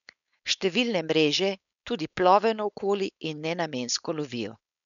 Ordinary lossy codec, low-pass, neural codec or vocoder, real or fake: none; 7.2 kHz; codec, 16 kHz, 4.8 kbps, FACodec; fake